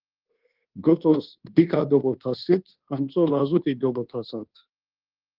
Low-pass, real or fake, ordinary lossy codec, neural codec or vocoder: 5.4 kHz; fake; Opus, 16 kbps; codec, 24 kHz, 1.2 kbps, DualCodec